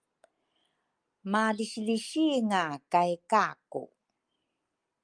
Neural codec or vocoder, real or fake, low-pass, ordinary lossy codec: none; real; 9.9 kHz; Opus, 32 kbps